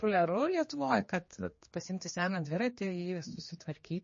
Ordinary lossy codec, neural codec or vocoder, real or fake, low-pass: MP3, 32 kbps; codec, 16 kHz, 2 kbps, X-Codec, HuBERT features, trained on general audio; fake; 7.2 kHz